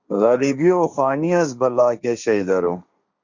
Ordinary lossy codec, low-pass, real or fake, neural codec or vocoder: Opus, 64 kbps; 7.2 kHz; fake; codec, 16 kHz, 1.1 kbps, Voila-Tokenizer